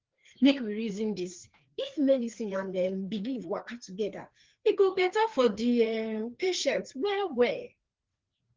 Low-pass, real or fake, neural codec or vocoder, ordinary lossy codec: 7.2 kHz; fake; codec, 16 kHz, 2 kbps, FreqCodec, larger model; Opus, 16 kbps